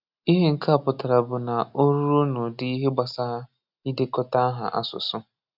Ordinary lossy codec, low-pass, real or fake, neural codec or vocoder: none; 5.4 kHz; real; none